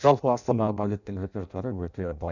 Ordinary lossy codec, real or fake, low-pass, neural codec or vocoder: none; fake; 7.2 kHz; codec, 16 kHz in and 24 kHz out, 0.6 kbps, FireRedTTS-2 codec